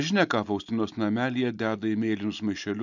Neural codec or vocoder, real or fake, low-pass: none; real; 7.2 kHz